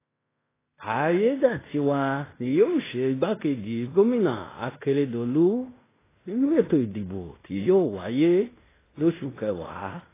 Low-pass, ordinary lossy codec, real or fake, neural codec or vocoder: 3.6 kHz; AAC, 16 kbps; fake; codec, 16 kHz in and 24 kHz out, 0.9 kbps, LongCat-Audio-Codec, fine tuned four codebook decoder